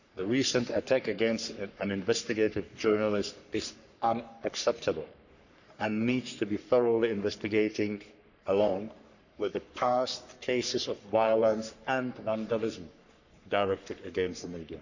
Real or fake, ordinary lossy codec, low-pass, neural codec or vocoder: fake; none; 7.2 kHz; codec, 44.1 kHz, 3.4 kbps, Pupu-Codec